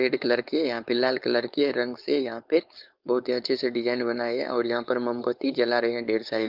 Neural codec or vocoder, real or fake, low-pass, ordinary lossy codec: codec, 16 kHz, 4.8 kbps, FACodec; fake; 5.4 kHz; Opus, 24 kbps